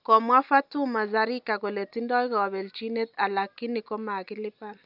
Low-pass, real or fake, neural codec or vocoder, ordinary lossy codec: 5.4 kHz; real; none; none